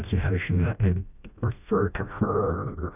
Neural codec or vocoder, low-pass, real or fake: codec, 16 kHz, 1 kbps, FreqCodec, smaller model; 3.6 kHz; fake